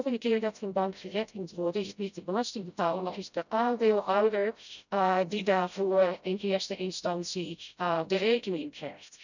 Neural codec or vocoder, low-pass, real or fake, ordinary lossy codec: codec, 16 kHz, 0.5 kbps, FreqCodec, smaller model; 7.2 kHz; fake; none